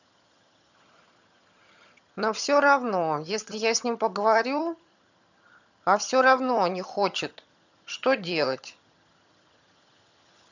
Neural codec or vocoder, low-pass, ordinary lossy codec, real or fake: vocoder, 22.05 kHz, 80 mel bands, HiFi-GAN; 7.2 kHz; none; fake